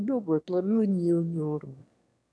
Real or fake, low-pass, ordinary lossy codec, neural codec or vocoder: fake; none; none; autoencoder, 22.05 kHz, a latent of 192 numbers a frame, VITS, trained on one speaker